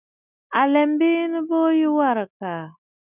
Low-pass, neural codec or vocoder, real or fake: 3.6 kHz; none; real